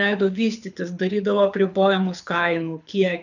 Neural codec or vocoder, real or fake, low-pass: codec, 24 kHz, 6 kbps, HILCodec; fake; 7.2 kHz